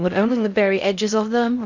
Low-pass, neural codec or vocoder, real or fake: 7.2 kHz; codec, 16 kHz in and 24 kHz out, 0.6 kbps, FocalCodec, streaming, 2048 codes; fake